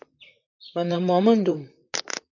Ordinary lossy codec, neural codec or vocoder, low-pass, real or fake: MP3, 64 kbps; vocoder, 44.1 kHz, 128 mel bands, Pupu-Vocoder; 7.2 kHz; fake